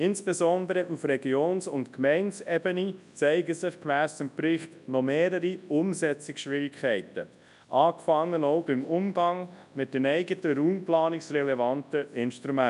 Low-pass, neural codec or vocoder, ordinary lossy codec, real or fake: 10.8 kHz; codec, 24 kHz, 0.9 kbps, WavTokenizer, large speech release; none; fake